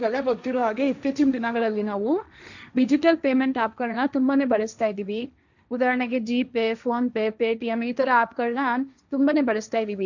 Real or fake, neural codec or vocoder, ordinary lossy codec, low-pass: fake; codec, 16 kHz, 1.1 kbps, Voila-Tokenizer; none; 7.2 kHz